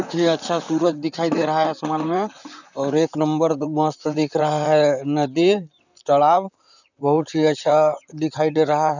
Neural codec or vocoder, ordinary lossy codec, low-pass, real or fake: vocoder, 44.1 kHz, 128 mel bands, Pupu-Vocoder; none; 7.2 kHz; fake